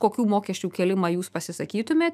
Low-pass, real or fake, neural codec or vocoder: 14.4 kHz; fake; autoencoder, 48 kHz, 128 numbers a frame, DAC-VAE, trained on Japanese speech